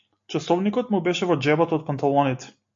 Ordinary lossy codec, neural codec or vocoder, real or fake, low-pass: AAC, 48 kbps; none; real; 7.2 kHz